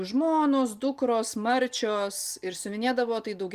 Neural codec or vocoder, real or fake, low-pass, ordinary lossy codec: none; real; 14.4 kHz; Opus, 32 kbps